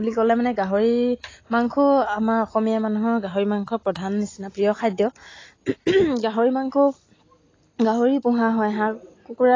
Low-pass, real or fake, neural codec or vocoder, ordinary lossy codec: 7.2 kHz; real; none; AAC, 32 kbps